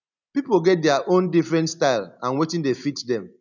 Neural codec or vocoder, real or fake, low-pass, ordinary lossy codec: none; real; 7.2 kHz; none